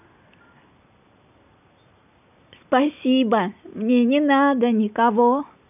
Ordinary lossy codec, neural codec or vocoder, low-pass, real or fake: none; none; 3.6 kHz; real